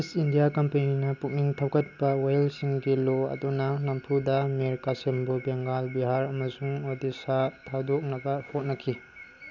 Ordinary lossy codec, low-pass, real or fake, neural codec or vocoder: none; 7.2 kHz; real; none